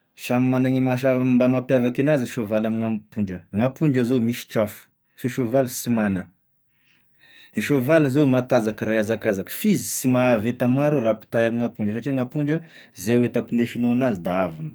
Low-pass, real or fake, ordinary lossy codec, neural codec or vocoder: none; fake; none; codec, 44.1 kHz, 2.6 kbps, SNAC